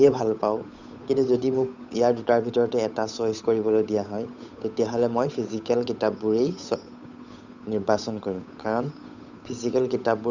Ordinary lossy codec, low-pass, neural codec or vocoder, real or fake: none; 7.2 kHz; codec, 16 kHz, 8 kbps, FunCodec, trained on Chinese and English, 25 frames a second; fake